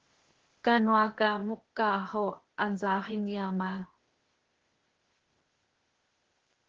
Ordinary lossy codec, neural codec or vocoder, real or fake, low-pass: Opus, 16 kbps; codec, 16 kHz, 0.8 kbps, ZipCodec; fake; 7.2 kHz